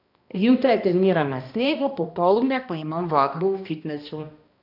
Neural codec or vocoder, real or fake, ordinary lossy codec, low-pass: codec, 16 kHz, 1 kbps, X-Codec, HuBERT features, trained on balanced general audio; fake; none; 5.4 kHz